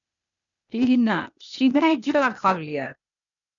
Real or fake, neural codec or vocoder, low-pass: fake; codec, 16 kHz, 0.8 kbps, ZipCodec; 7.2 kHz